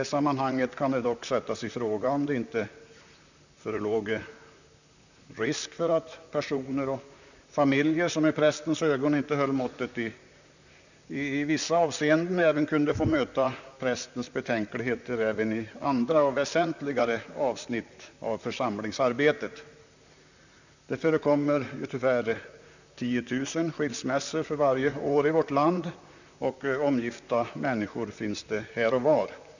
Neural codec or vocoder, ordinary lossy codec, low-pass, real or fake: vocoder, 44.1 kHz, 128 mel bands, Pupu-Vocoder; none; 7.2 kHz; fake